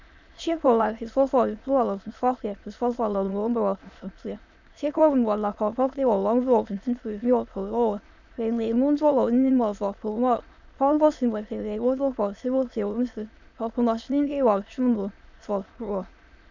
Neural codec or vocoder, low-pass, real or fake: autoencoder, 22.05 kHz, a latent of 192 numbers a frame, VITS, trained on many speakers; 7.2 kHz; fake